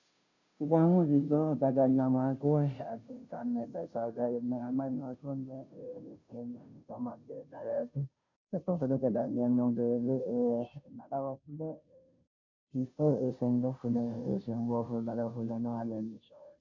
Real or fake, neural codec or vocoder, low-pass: fake; codec, 16 kHz, 0.5 kbps, FunCodec, trained on Chinese and English, 25 frames a second; 7.2 kHz